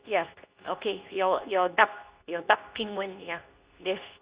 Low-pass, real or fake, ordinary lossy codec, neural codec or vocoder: 3.6 kHz; fake; Opus, 64 kbps; codec, 24 kHz, 0.9 kbps, WavTokenizer, medium speech release version 2